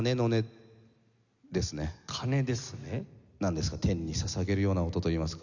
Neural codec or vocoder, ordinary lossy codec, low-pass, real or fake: none; none; 7.2 kHz; real